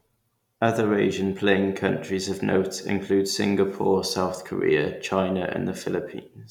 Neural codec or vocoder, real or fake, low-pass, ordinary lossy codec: none; real; 19.8 kHz; none